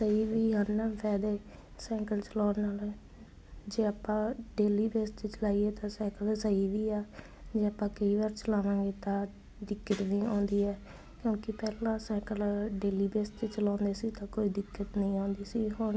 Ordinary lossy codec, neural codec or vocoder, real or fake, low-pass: none; none; real; none